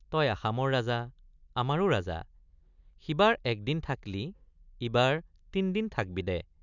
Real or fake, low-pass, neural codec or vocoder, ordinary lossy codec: real; 7.2 kHz; none; none